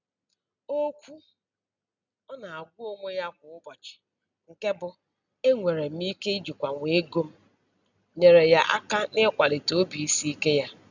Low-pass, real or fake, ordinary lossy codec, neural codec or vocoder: 7.2 kHz; real; none; none